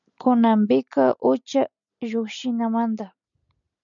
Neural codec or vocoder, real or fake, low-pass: none; real; 7.2 kHz